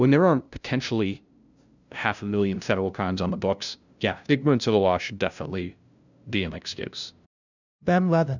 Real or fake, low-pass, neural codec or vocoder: fake; 7.2 kHz; codec, 16 kHz, 0.5 kbps, FunCodec, trained on LibriTTS, 25 frames a second